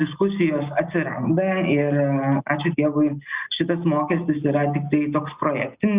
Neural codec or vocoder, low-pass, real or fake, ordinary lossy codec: none; 3.6 kHz; real; Opus, 64 kbps